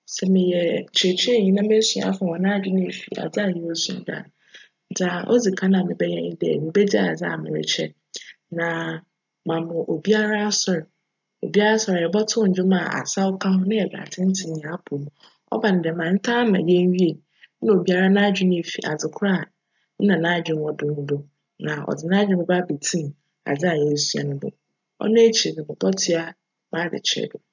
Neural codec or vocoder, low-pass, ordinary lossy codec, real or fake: none; 7.2 kHz; none; real